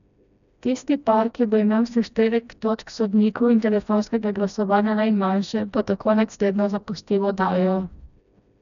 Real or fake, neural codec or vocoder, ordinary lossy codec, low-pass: fake; codec, 16 kHz, 1 kbps, FreqCodec, smaller model; MP3, 96 kbps; 7.2 kHz